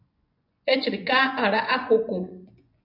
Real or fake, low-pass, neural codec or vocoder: fake; 5.4 kHz; vocoder, 24 kHz, 100 mel bands, Vocos